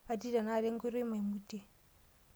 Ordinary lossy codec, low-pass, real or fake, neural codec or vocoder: none; none; real; none